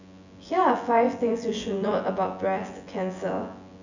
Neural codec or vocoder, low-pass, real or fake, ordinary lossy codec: vocoder, 24 kHz, 100 mel bands, Vocos; 7.2 kHz; fake; none